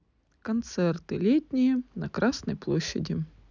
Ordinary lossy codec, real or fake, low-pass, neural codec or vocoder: none; real; 7.2 kHz; none